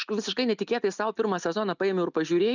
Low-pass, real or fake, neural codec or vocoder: 7.2 kHz; real; none